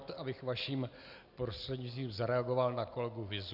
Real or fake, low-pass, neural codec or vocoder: real; 5.4 kHz; none